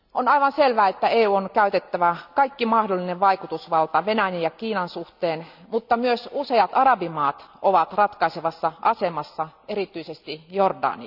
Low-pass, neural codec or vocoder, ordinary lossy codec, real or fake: 5.4 kHz; none; none; real